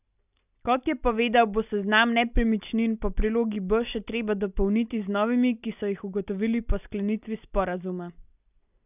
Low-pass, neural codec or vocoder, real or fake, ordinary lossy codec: 3.6 kHz; none; real; none